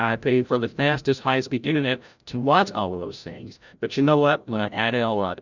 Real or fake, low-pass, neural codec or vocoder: fake; 7.2 kHz; codec, 16 kHz, 0.5 kbps, FreqCodec, larger model